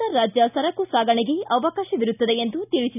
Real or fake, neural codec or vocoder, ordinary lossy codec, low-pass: real; none; none; 3.6 kHz